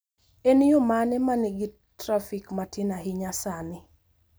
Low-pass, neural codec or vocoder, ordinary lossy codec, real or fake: none; none; none; real